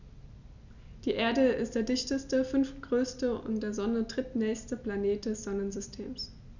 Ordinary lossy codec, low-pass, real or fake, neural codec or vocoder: none; 7.2 kHz; real; none